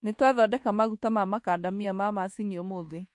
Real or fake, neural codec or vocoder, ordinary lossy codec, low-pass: fake; codec, 24 kHz, 1.2 kbps, DualCodec; MP3, 48 kbps; 10.8 kHz